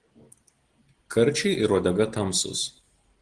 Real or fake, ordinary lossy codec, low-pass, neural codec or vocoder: real; Opus, 16 kbps; 9.9 kHz; none